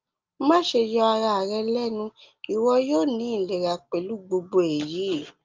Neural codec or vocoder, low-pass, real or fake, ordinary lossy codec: none; 7.2 kHz; real; Opus, 32 kbps